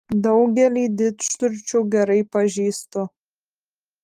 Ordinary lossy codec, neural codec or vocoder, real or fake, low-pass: Opus, 32 kbps; none; real; 14.4 kHz